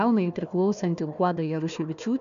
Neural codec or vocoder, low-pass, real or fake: codec, 16 kHz, 1 kbps, FunCodec, trained on Chinese and English, 50 frames a second; 7.2 kHz; fake